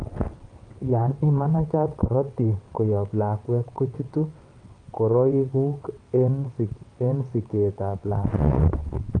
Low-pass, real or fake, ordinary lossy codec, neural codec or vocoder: 9.9 kHz; fake; Opus, 32 kbps; vocoder, 22.05 kHz, 80 mel bands, WaveNeXt